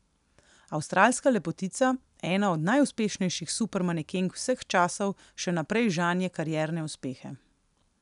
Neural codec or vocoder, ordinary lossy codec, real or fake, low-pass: none; none; real; 10.8 kHz